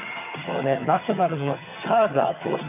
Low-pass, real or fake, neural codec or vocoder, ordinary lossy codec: 3.6 kHz; fake; vocoder, 22.05 kHz, 80 mel bands, HiFi-GAN; none